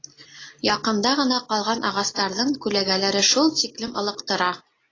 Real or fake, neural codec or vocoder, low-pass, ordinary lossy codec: real; none; 7.2 kHz; AAC, 32 kbps